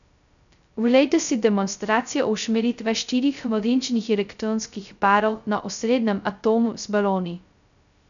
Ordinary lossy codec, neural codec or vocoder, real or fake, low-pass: none; codec, 16 kHz, 0.2 kbps, FocalCodec; fake; 7.2 kHz